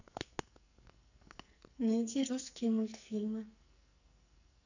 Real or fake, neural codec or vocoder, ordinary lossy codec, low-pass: fake; codec, 44.1 kHz, 2.6 kbps, SNAC; none; 7.2 kHz